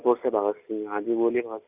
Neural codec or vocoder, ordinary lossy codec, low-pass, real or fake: none; none; 3.6 kHz; real